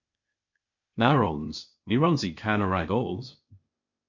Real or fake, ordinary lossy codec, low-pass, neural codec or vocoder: fake; MP3, 48 kbps; 7.2 kHz; codec, 16 kHz, 0.8 kbps, ZipCodec